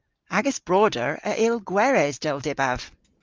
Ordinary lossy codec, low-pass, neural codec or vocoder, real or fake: Opus, 24 kbps; 7.2 kHz; none; real